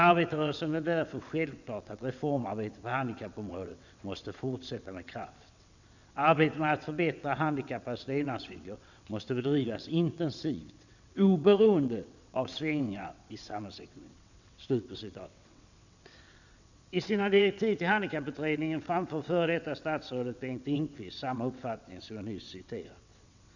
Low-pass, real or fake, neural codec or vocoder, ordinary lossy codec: 7.2 kHz; fake; vocoder, 22.05 kHz, 80 mel bands, WaveNeXt; none